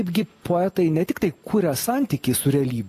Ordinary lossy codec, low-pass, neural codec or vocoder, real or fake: AAC, 48 kbps; 14.4 kHz; none; real